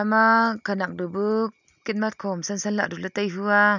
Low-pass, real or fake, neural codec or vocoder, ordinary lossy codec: 7.2 kHz; real; none; none